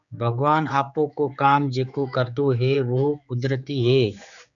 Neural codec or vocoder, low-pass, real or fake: codec, 16 kHz, 4 kbps, X-Codec, HuBERT features, trained on general audio; 7.2 kHz; fake